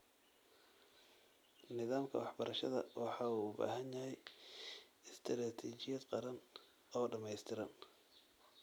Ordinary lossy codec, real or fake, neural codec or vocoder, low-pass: none; real; none; none